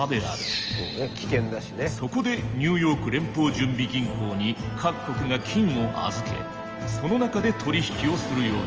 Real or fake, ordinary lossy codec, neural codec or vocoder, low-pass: real; Opus, 24 kbps; none; 7.2 kHz